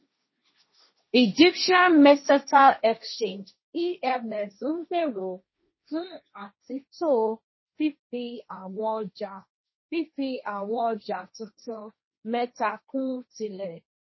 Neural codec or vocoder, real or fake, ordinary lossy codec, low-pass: codec, 16 kHz, 1.1 kbps, Voila-Tokenizer; fake; MP3, 24 kbps; 7.2 kHz